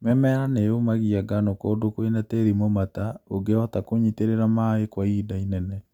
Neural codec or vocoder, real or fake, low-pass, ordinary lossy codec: none; real; 19.8 kHz; none